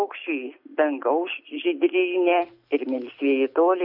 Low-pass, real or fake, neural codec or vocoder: 5.4 kHz; real; none